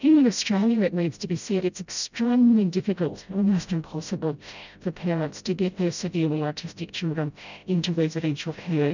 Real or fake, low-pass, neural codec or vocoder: fake; 7.2 kHz; codec, 16 kHz, 0.5 kbps, FreqCodec, smaller model